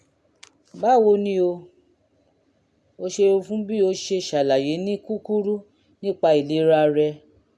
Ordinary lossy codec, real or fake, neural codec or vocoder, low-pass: none; real; none; none